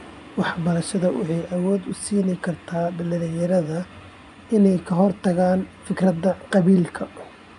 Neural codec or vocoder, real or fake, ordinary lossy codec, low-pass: vocoder, 24 kHz, 100 mel bands, Vocos; fake; AAC, 96 kbps; 10.8 kHz